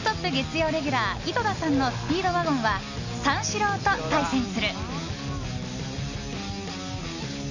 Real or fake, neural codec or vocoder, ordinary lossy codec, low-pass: real; none; none; 7.2 kHz